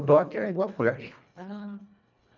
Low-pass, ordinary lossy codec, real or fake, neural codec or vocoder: 7.2 kHz; none; fake; codec, 24 kHz, 1.5 kbps, HILCodec